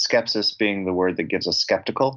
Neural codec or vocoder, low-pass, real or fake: none; 7.2 kHz; real